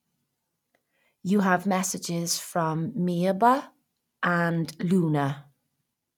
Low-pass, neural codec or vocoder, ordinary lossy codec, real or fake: 19.8 kHz; none; none; real